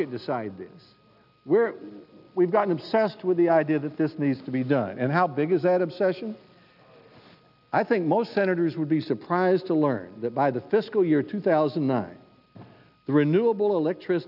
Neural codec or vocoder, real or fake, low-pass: none; real; 5.4 kHz